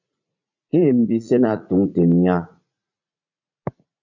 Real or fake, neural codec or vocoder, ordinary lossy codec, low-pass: fake; vocoder, 44.1 kHz, 80 mel bands, Vocos; MP3, 64 kbps; 7.2 kHz